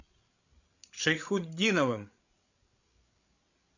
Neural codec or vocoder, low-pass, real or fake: codec, 16 kHz, 8 kbps, FreqCodec, larger model; 7.2 kHz; fake